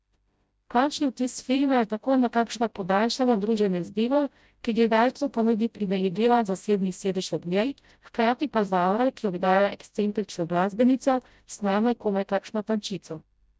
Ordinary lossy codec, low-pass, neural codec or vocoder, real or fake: none; none; codec, 16 kHz, 0.5 kbps, FreqCodec, smaller model; fake